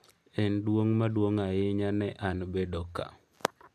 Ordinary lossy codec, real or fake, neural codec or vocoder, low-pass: AAC, 96 kbps; real; none; 14.4 kHz